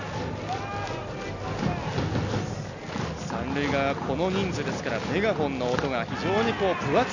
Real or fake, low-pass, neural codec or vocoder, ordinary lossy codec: real; 7.2 kHz; none; none